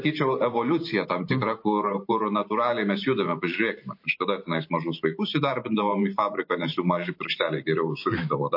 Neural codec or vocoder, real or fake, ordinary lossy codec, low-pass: vocoder, 44.1 kHz, 128 mel bands every 512 samples, BigVGAN v2; fake; MP3, 32 kbps; 5.4 kHz